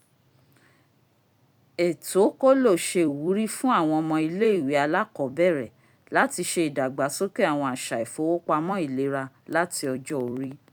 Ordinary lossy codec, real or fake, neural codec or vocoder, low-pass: none; fake; vocoder, 44.1 kHz, 128 mel bands every 256 samples, BigVGAN v2; 19.8 kHz